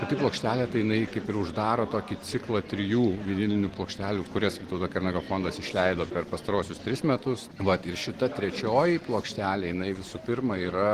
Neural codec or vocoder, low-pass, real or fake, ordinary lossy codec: none; 14.4 kHz; real; Opus, 16 kbps